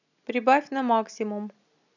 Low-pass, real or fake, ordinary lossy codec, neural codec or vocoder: 7.2 kHz; real; none; none